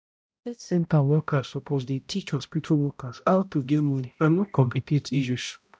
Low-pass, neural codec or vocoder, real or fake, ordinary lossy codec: none; codec, 16 kHz, 0.5 kbps, X-Codec, HuBERT features, trained on balanced general audio; fake; none